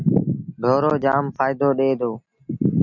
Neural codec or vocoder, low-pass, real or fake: none; 7.2 kHz; real